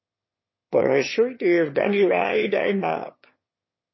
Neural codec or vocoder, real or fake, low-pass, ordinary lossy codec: autoencoder, 22.05 kHz, a latent of 192 numbers a frame, VITS, trained on one speaker; fake; 7.2 kHz; MP3, 24 kbps